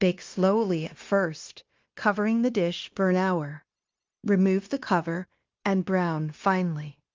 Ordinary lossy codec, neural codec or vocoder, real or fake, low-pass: Opus, 24 kbps; codec, 16 kHz, 0.9 kbps, LongCat-Audio-Codec; fake; 7.2 kHz